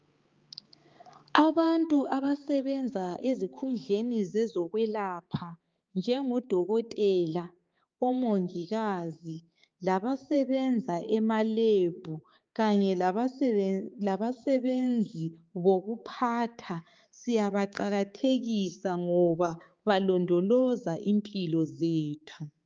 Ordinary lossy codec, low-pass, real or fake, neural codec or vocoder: Opus, 24 kbps; 7.2 kHz; fake; codec, 16 kHz, 4 kbps, X-Codec, HuBERT features, trained on balanced general audio